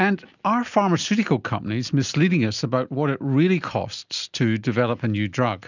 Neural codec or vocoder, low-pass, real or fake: none; 7.2 kHz; real